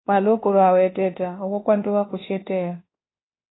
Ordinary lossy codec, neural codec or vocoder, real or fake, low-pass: AAC, 16 kbps; codec, 24 kHz, 1.2 kbps, DualCodec; fake; 7.2 kHz